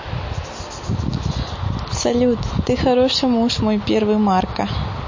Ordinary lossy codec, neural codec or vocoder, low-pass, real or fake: MP3, 32 kbps; none; 7.2 kHz; real